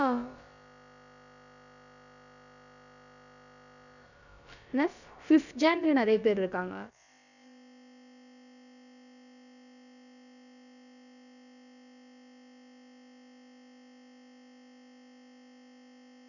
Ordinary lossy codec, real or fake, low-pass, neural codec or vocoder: none; fake; 7.2 kHz; codec, 16 kHz, about 1 kbps, DyCAST, with the encoder's durations